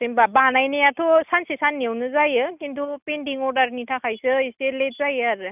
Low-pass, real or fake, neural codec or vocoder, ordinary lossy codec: 3.6 kHz; real; none; none